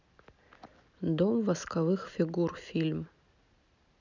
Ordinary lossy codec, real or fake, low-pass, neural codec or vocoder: none; real; 7.2 kHz; none